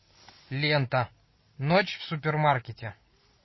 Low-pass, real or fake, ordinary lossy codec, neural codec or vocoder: 7.2 kHz; real; MP3, 24 kbps; none